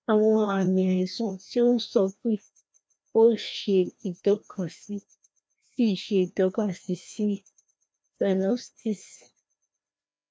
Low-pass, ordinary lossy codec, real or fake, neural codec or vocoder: none; none; fake; codec, 16 kHz, 1 kbps, FreqCodec, larger model